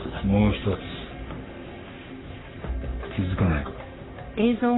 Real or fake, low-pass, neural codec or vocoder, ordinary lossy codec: fake; 7.2 kHz; codec, 44.1 kHz, 3.4 kbps, Pupu-Codec; AAC, 16 kbps